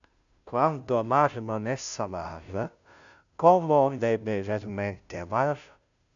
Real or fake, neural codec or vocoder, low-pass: fake; codec, 16 kHz, 0.5 kbps, FunCodec, trained on Chinese and English, 25 frames a second; 7.2 kHz